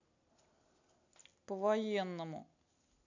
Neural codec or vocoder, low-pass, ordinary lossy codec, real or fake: none; 7.2 kHz; AAC, 48 kbps; real